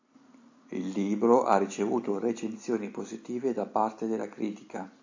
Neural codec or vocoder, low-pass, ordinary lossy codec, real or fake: none; 7.2 kHz; AAC, 48 kbps; real